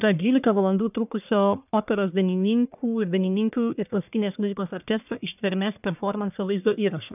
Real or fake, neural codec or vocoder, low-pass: fake; codec, 44.1 kHz, 1.7 kbps, Pupu-Codec; 3.6 kHz